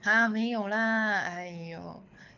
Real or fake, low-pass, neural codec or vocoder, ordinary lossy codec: fake; 7.2 kHz; codec, 24 kHz, 6 kbps, HILCodec; none